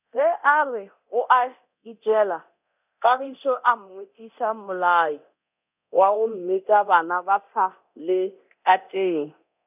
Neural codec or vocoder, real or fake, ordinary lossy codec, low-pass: codec, 24 kHz, 0.9 kbps, DualCodec; fake; none; 3.6 kHz